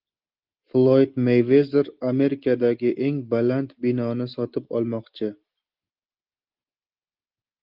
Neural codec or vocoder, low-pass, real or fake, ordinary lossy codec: none; 5.4 kHz; real; Opus, 32 kbps